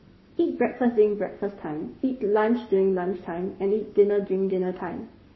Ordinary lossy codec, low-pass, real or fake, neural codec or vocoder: MP3, 24 kbps; 7.2 kHz; fake; codec, 44.1 kHz, 7.8 kbps, Pupu-Codec